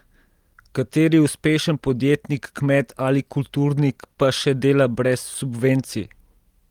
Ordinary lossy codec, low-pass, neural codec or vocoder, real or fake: Opus, 24 kbps; 19.8 kHz; none; real